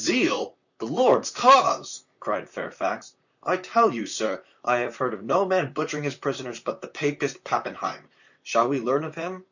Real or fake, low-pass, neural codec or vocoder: fake; 7.2 kHz; vocoder, 44.1 kHz, 128 mel bands, Pupu-Vocoder